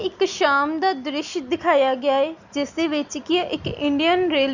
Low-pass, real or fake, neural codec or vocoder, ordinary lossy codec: 7.2 kHz; real; none; none